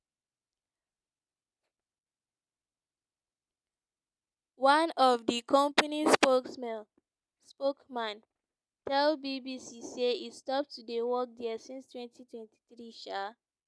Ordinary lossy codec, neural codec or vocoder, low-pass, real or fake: none; none; none; real